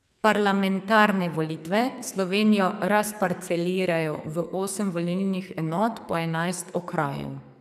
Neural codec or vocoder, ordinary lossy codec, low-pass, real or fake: codec, 44.1 kHz, 2.6 kbps, SNAC; none; 14.4 kHz; fake